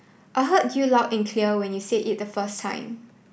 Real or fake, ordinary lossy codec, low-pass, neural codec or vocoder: real; none; none; none